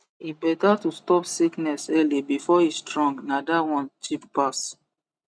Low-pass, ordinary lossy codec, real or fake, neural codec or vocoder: none; none; real; none